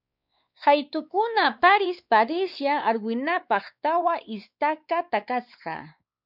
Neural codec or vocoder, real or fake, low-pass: codec, 16 kHz, 4 kbps, X-Codec, WavLM features, trained on Multilingual LibriSpeech; fake; 5.4 kHz